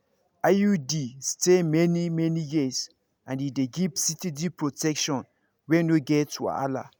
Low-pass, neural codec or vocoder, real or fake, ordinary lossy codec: none; none; real; none